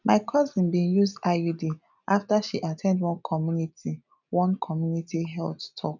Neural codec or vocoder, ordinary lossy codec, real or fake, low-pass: none; none; real; 7.2 kHz